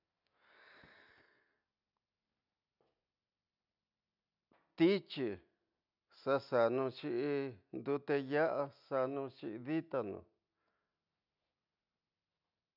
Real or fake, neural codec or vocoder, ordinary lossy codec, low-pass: real; none; none; 5.4 kHz